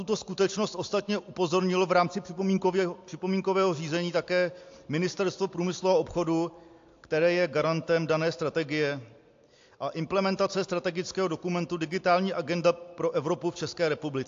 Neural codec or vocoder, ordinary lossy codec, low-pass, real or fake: none; AAC, 48 kbps; 7.2 kHz; real